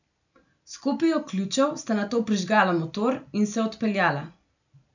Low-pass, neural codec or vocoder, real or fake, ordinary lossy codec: 7.2 kHz; none; real; none